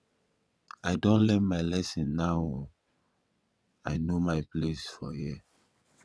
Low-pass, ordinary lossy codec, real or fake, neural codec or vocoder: none; none; fake; vocoder, 22.05 kHz, 80 mel bands, WaveNeXt